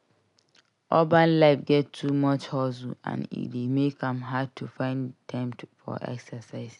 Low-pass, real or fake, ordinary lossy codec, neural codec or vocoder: 10.8 kHz; real; none; none